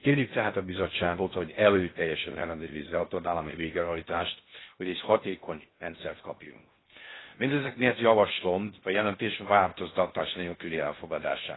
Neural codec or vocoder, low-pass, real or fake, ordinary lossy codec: codec, 16 kHz in and 24 kHz out, 0.6 kbps, FocalCodec, streaming, 2048 codes; 7.2 kHz; fake; AAC, 16 kbps